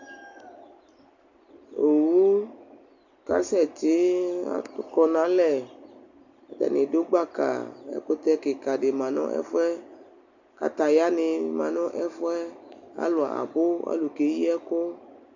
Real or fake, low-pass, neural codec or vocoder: real; 7.2 kHz; none